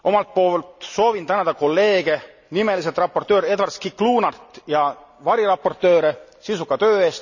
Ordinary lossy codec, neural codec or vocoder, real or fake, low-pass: none; none; real; 7.2 kHz